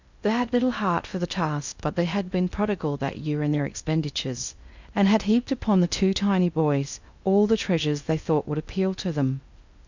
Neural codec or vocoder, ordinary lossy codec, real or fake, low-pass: codec, 16 kHz in and 24 kHz out, 0.6 kbps, FocalCodec, streaming, 2048 codes; Opus, 64 kbps; fake; 7.2 kHz